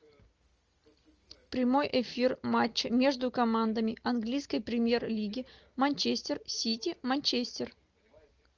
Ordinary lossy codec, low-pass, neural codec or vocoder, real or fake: Opus, 24 kbps; 7.2 kHz; none; real